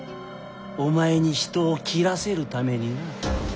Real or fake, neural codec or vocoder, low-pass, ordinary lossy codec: real; none; none; none